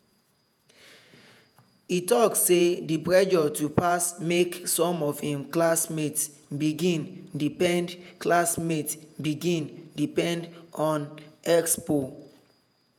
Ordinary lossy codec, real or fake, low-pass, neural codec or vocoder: none; fake; none; vocoder, 48 kHz, 128 mel bands, Vocos